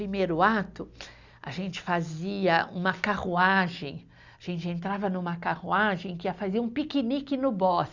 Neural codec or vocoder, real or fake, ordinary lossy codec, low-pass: none; real; Opus, 64 kbps; 7.2 kHz